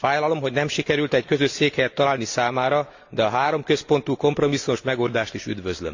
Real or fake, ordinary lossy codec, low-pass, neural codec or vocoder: real; AAC, 48 kbps; 7.2 kHz; none